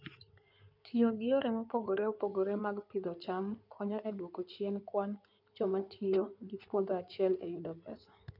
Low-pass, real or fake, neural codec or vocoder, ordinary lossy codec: 5.4 kHz; fake; codec, 16 kHz in and 24 kHz out, 2.2 kbps, FireRedTTS-2 codec; none